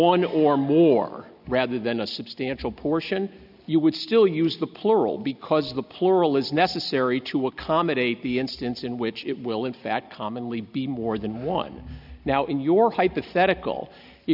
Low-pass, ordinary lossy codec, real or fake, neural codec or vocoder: 5.4 kHz; AAC, 48 kbps; real; none